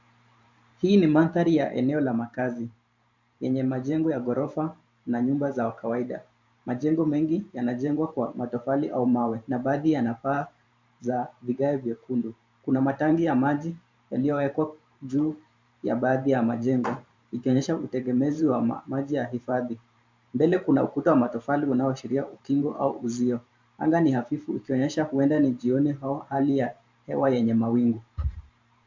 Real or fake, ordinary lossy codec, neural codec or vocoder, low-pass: fake; MP3, 64 kbps; vocoder, 44.1 kHz, 128 mel bands every 512 samples, BigVGAN v2; 7.2 kHz